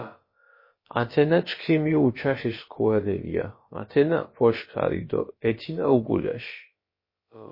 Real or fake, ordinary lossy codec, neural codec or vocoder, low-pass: fake; MP3, 24 kbps; codec, 16 kHz, about 1 kbps, DyCAST, with the encoder's durations; 5.4 kHz